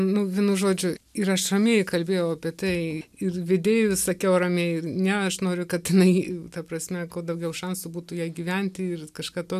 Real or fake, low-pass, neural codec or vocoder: real; 14.4 kHz; none